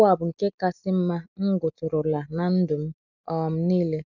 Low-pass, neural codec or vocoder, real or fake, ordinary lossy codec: 7.2 kHz; none; real; none